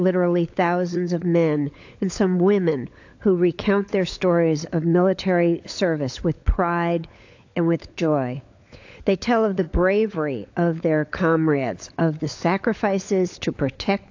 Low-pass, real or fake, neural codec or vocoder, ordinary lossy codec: 7.2 kHz; fake; codec, 16 kHz, 16 kbps, FunCodec, trained on LibriTTS, 50 frames a second; AAC, 48 kbps